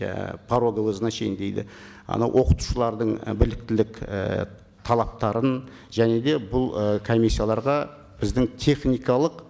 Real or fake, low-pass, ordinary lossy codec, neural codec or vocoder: real; none; none; none